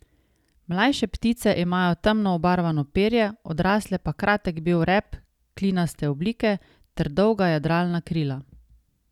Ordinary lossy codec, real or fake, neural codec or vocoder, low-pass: none; real; none; 19.8 kHz